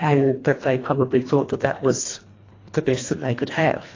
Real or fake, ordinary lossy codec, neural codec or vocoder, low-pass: fake; AAC, 32 kbps; codec, 24 kHz, 1.5 kbps, HILCodec; 7.2 kHz